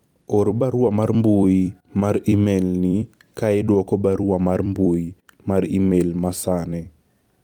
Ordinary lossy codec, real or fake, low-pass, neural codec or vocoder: Opus, 32 kbps; fake; 19.8 kHz; vocoder, 44.1 kHz, 128 mel bands every 256 samples, BigVGAN v2